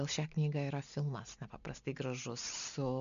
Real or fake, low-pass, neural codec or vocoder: real; 7.2 kHz; none